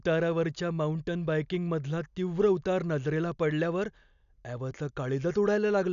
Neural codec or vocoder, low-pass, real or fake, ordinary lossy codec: none; 7.2 kHz; real; none